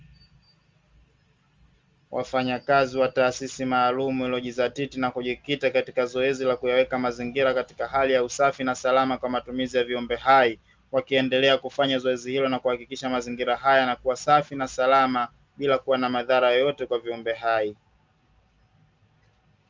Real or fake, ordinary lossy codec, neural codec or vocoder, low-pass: real; Opus, 32 kbps; none; 7.2 kHz